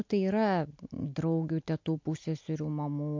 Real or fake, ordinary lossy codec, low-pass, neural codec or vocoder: real; MP3, 48 kbps; 7.2 kHz; none